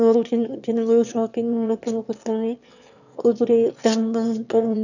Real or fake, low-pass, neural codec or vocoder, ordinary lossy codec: fake; 7.2 kHz; autoencoder, 22.05 kHz, a latent of 192 numbers a frame, VITS, trained on one speaker; none